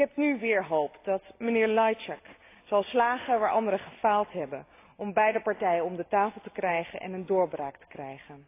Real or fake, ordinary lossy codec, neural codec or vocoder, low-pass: real; AAC, 24 kbps; none; 3.6 kHz